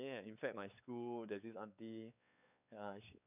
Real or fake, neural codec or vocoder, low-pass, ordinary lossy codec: fake; codec, 16 kHz, 4 kbps, X-Codec, WavLM features, trained on Multilingual LibriSpeech; 3.6 kHz; none